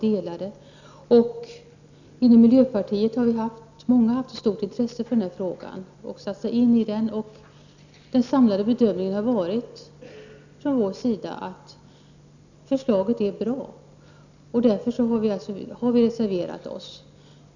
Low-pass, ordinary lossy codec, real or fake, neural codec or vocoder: 7.2 kHz; none; real; none